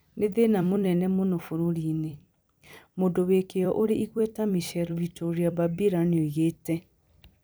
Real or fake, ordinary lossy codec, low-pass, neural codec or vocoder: fake; none; none; vocoder, 44.1 kHz, 128 mel bands every 256 samples, BigVGAN v2